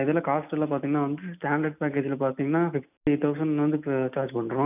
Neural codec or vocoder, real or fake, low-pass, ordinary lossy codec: none; real; 3.6 kHz; none